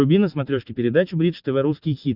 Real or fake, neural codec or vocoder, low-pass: real; none; 5.4 kHz